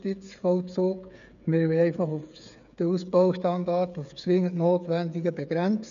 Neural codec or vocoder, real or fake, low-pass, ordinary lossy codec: codec, 16 kHz, 8 kbps, FreqCodec, smaller model; fake; 7.2 kHz; none